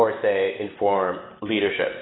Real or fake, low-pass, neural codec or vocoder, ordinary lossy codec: real; 7.2 kHz; none; AAC, 16 kbps